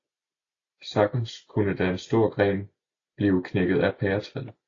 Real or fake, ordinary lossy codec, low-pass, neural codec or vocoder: real; AAC, 32 kbps; 7.2 kHz; none